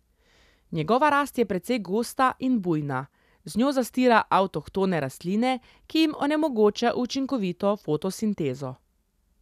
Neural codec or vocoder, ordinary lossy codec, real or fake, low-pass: none; none; real; 14.4 kHz